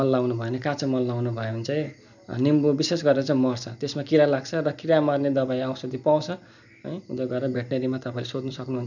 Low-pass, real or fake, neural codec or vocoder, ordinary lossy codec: 7.2 kHz; real; none; none